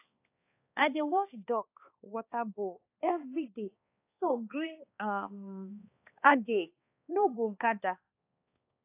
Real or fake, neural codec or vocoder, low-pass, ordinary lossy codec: fake; codec, 16 kHz, 2 kbps, X-Codec, HuBERT features, trained on balanced general audio; 3.6 kHz; AAC, 24 kbps